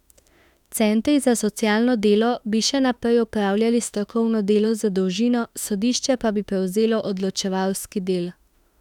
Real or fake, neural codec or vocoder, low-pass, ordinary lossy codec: fake; autoencoder, 48 kHz, 32 numbers a frame, DAC-VAE, trained on Japanese speech; 19.8 kHz; none